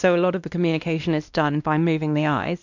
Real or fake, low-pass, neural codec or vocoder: fake; 7.2 kHz; codec, 16 kHz, 1 kbps, X-Codec, WavLM features, trained on Multilingual LibriSpeech